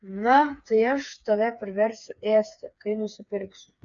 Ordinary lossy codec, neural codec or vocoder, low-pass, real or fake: MP3, 96 kbps; codec, 16 kHz, 4 kbps, FreqCodec, smaller model; 7.2 kHz; fake